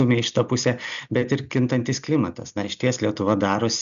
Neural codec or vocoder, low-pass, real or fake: none; 7.2 kHz; real